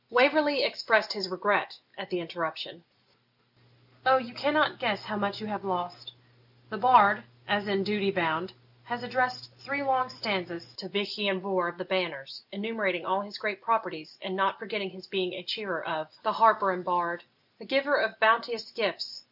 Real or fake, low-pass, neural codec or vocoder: real; 5.4 kHz; none